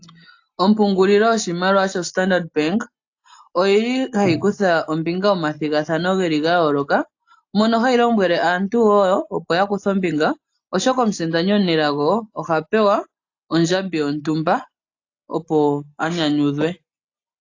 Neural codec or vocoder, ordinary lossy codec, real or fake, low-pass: none; AAC, 48 kbps; real; 7.2 kHz